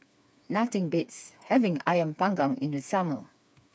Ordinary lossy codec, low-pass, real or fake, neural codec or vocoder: none; none; fake; codec, 16 kHz, 4 kbps, FreqCodec, smaller model